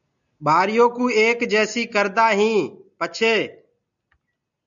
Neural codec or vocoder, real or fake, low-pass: none; real; 7.2 kHz